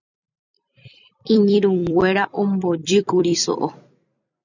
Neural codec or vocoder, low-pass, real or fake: vocoder, 44.1 kHz, 128 mel bands every 256 samples, BigVGAN v2; 7.2 kHz; fake